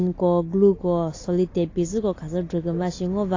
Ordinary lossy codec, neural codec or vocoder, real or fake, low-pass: AAC, 32 kbps; none; real; 7.2 kHz